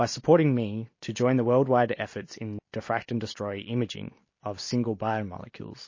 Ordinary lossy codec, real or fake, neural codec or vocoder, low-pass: MP3, 32 kbps; real; none; 7.2 kHz